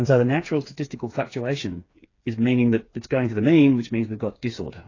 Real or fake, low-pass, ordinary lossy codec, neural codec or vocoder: fake; 7.2 kHz; AAC, 32 kbps; codec, 16 kHz, 4 kbps, FreqCodec, smaller model